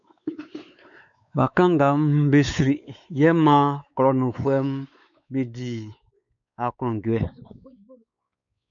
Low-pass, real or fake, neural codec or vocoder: 7.2 kHz; fake; codec, 16 kHz, 4 kbps, X-Codec, WavLM features, trained on Multilingual LibriSpeech